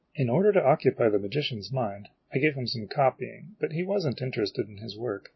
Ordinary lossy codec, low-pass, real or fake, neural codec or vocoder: MP3, 24 kbps; 7.2 kHz; real; none